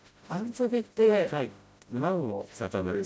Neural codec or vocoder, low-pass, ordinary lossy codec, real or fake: codec, 16 kHz, 0.5 kbps, FreqCodec, smaller model; none; none; fake